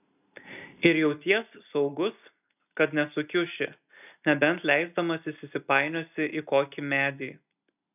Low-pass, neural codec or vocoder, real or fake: 3.6 kHz; none; real